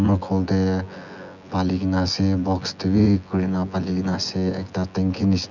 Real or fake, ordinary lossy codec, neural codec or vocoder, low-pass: fake; none; vocoder, 24 kHz, 100 mel bands, Vocos; 7.2 kHz